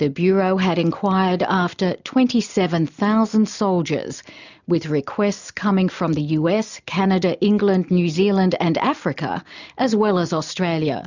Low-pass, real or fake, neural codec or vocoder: 7.2 kHz; real; none